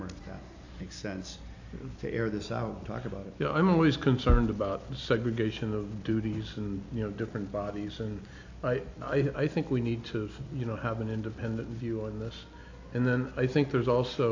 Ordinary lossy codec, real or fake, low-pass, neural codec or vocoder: AAC, 48 kbps; real; 7.2 kHz; none